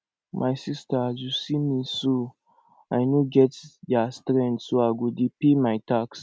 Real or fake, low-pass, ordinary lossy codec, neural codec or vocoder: real; none; none; none